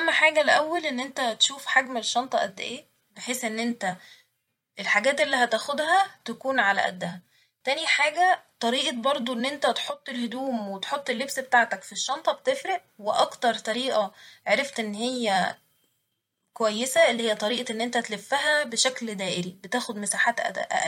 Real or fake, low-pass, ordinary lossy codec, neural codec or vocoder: fake; 19.8 kHz; MP3, 64 kbps; vocoder, 44.1 kHz, 128 mel bands every 256 samples, BigVGAN v2